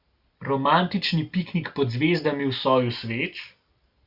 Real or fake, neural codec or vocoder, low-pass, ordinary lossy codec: real; none; 5.4 kHz; Opus, 64 kbps